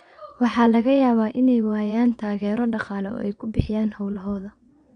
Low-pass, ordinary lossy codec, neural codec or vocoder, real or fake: 9.9 kHz; none; vocoder, 22.05 kHz, 80 mel bands, Vocos; fake